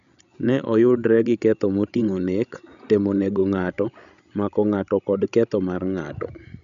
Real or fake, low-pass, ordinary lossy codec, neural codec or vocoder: fake; 7.2 kHz; none; codec, 16 kHz, 16 kbps, FreqCodec, larger model